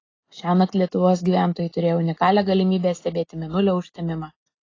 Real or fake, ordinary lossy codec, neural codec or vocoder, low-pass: real; AAC, 32 kbps; none; 7.2 kHz